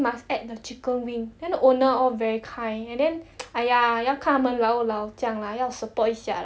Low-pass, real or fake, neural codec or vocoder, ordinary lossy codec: none; real; none; none